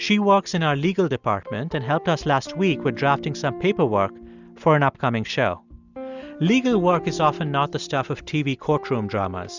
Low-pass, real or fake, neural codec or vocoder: 7.2 kHz; real; none